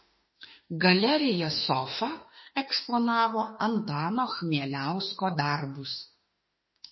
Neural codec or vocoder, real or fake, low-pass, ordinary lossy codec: autoencoder, 48 kHz, 32 numbers a frame, DAC-VAE, trained on Japanese speech; fake; 7.2 kHz; MP3, 24 kbps